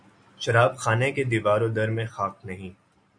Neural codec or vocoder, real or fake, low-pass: none; real; 9.9 kHz